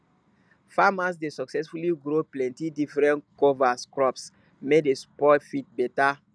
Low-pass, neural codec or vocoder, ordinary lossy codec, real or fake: none; none; none; real